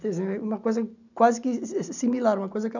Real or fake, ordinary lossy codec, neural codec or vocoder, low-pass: real; none; none; 7.2 kHz